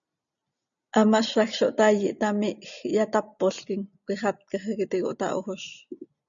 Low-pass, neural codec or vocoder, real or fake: 7.2 kHz; none; real